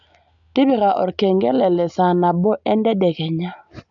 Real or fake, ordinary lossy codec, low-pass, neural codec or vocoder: real; AAC, 64 kbps; 7.2 kHz; none